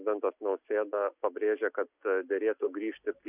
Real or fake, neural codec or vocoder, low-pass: real; none; 3.6 kHz